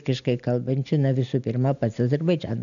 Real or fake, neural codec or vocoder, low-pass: real; none; 7.2 kHz